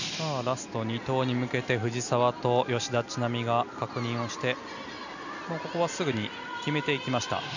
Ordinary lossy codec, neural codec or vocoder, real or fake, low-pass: none; none; real; 7.2 kHz